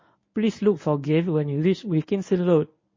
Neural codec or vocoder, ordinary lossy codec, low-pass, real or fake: codec, 24 kHz, 0.9 kbps, WavTokenizer, medium speech release version 1; MP3, 32 kbps; 7.2 kHz; fake